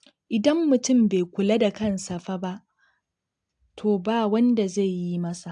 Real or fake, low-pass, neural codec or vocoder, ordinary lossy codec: real; 9.9 kHz; none; AAC, 64 kbps